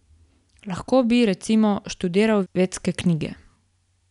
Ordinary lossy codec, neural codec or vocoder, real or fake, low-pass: none; none; real; 10.8 kHz